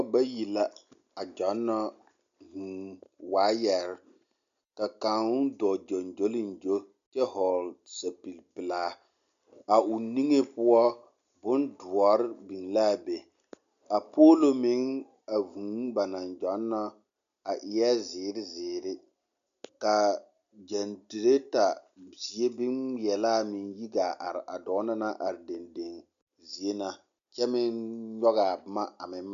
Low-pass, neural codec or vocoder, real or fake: 7.2 kHz; none; real